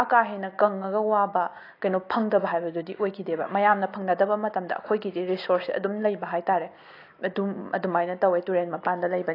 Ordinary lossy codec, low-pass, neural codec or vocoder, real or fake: AAC, 32 kbps; 5.4 kHz; none; real